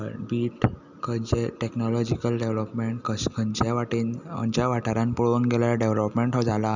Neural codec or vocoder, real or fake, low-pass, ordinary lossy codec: none; real; 7.2 kHz; none